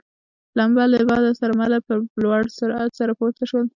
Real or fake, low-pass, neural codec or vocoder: real; 7.2 kHz; none